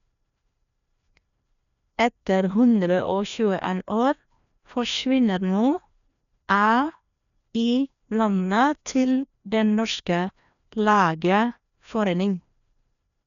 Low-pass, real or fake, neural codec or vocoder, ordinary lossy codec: 7.2 kHz; fake; codec, 16 kHz, 1 kbps, FreqCodec, larger model; none